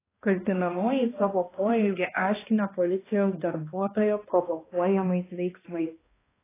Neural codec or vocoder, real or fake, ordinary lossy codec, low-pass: codec, 16 kHz, 1 kbps, X-Codec, HuBERT features, trained on balanced general audio; fake; AAC, 16 kbps; 3.6 kHz